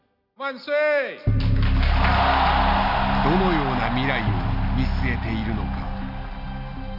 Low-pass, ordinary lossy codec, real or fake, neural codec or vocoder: 5.4 kHz; none; real; none